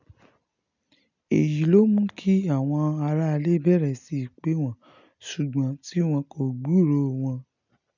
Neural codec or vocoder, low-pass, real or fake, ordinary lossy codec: none; 7.2 kHz; real; none